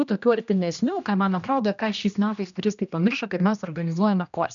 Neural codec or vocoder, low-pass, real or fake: codec, 16 kHz, 1 kbps, X-Codec, HuBERT features, trained on general audio; 7.2 kHz; fake